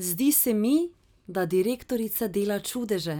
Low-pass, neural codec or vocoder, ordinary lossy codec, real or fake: none; none; none; real